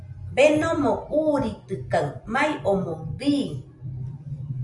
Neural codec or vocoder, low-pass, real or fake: none; 10.8 kHz; real